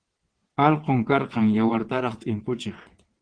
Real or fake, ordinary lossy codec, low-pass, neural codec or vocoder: fake; Opus, 16 kbps; 9.9 kHz; vocoder, 22.05 kHz, 80 mel bands, WaveNeXt